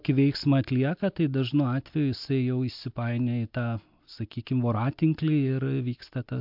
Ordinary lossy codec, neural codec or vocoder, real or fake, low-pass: MP3, 48 kbps; none; real; 5.4 kHz